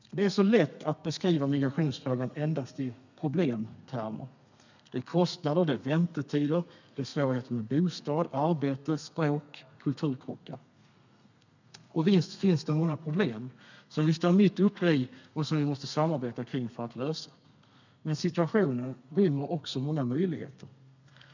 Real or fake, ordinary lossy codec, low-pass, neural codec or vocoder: fake; none; 7.2 kHz; codec, 32 kHz, 1.9 kbps, SNAC